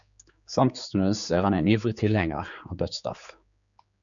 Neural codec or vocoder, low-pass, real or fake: codec, 16 kHz, 4 kbps, X-Codec, HuBERT features, trained on general audio; 7.2 kHz; fake